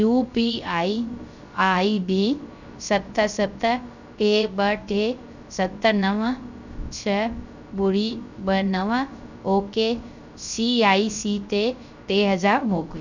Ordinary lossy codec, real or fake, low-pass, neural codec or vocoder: none; fake; 7.2 kHz; codec, 16 kHz, 0.3 kbps, FocalCodec